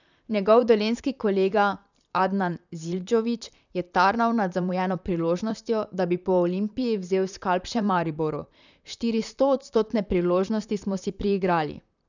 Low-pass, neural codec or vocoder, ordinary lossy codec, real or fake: 7.2 kHz; vocoder, 44.1 kHz, 128 mel bands, Pupu-Vocoder; none; fake